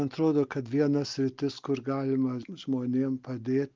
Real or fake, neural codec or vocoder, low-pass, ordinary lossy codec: real; none; 7.2 kHz; Opus, 24 kbps